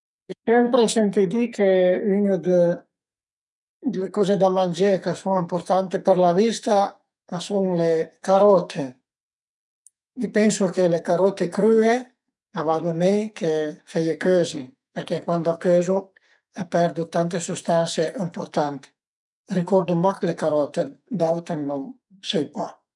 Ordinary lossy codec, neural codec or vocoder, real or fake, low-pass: none; codec, 44.1 kHz, 2.6 kbps, SNAC; fake; 10.8 kHz